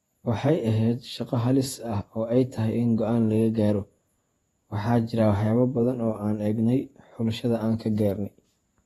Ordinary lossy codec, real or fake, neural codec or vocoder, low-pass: AAC, 32 kbps; fake; vocoder, 48 kHz, 128 mel bands, Vocos; 19.8 kHz